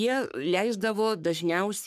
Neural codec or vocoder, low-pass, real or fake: codec, 44.1 kHz, 3.4 kbps, Pupu-Codec; 14.4 kHz; fake